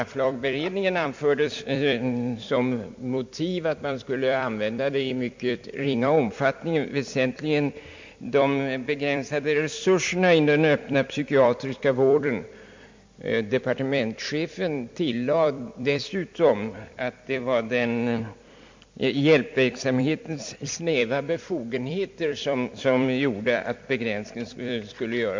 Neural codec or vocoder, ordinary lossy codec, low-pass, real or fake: vocoder, 44.1 kHz, 128 mel bands every 256 samples, BigVGAN v2; MP3, 48 kbps; 7.2 kHz; fake